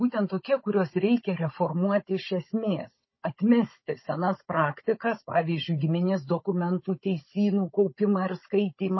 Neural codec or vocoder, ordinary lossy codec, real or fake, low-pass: codec, 16 kHz, 16 kbps, FunCodec, trained on Chinese and English, 50 frames a second; MP3, 24 kbps; fake; 7.2 kHz